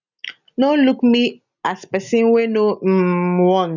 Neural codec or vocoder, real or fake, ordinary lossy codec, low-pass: none; real; none; 7.2 kHz